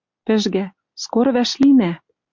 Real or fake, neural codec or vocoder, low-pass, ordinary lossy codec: real; none; 7.2 kHz; MP3, 64 kbps